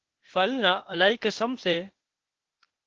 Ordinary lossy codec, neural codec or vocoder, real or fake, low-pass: Opus, 16 kbps; codec, 16 kHz, 0.8 kbps, ZipCodec; fake; 7.2 kHz